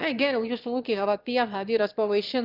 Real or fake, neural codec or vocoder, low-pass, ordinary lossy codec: fake; autoencoder, 22.05 kHz, a latent of 192 numbers a frame, VITS, trained on one speaker; 5.4 kHz; Opus, 32 kbps